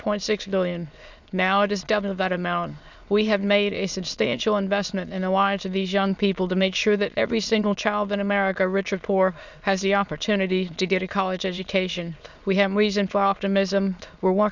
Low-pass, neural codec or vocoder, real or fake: 7.2 kHz; autoencoder, 22.05 kHz, a latent of 192 numbers a frame, VITS, trained on many speakers; fake